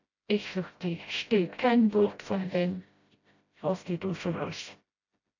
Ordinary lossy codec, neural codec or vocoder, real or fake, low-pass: AAC, 48 kbps; codec, 16 kHz, 0.5 kbps, FreqCodec, smaller model; fake; 7.2 kHz